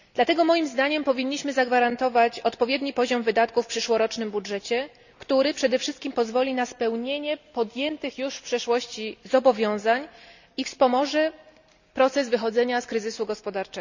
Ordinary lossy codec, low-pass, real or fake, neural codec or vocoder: none; 7.2 kHz; real; none